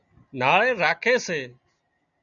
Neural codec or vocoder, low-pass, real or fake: none; 7.2 kHz; real